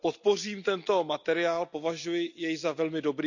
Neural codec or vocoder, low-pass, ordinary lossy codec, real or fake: none; 7.2 kHz; none; real